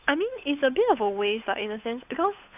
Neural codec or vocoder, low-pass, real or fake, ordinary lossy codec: codec, 16 kHz, 8 kbps, FunCodec, trained on Chinese and English, 25 frames a second; 3.6 kHz; fake; none